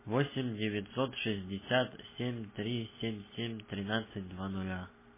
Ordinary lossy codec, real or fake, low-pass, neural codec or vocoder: MP3, 16 kbps; real; 3.6 kHz; none